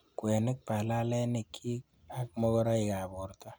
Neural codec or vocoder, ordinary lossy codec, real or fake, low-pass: none; none; real; none